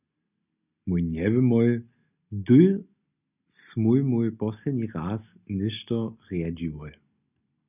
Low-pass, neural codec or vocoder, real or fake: 3.6 kHz; none; real